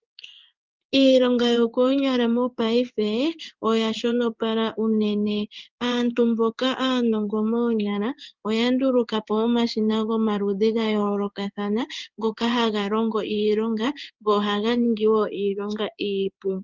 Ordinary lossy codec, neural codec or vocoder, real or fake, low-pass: Opus, 24 kbps; codec, 16 kHz in and 24 kHz out, 1 kbps, XY-Tokenizer; fake; 7.2 kHz